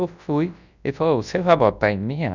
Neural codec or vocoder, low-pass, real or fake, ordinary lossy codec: codec, 24 kHz, 0.9 kbps, WavTokenizer, large speech release; 7.2 kHz; fake; none